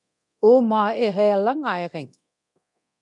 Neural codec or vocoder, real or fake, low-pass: codec, 24 kHz, 0.9 kbps, DualCodec; fake; 10.8 kHz